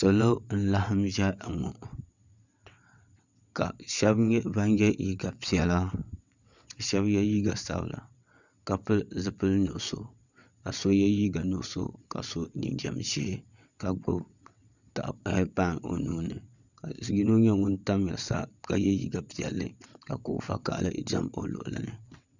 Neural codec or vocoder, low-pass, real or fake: vocoder, 22.05 kHz, 80 mel bands, WaveNeXt; 7.2 kHz; fake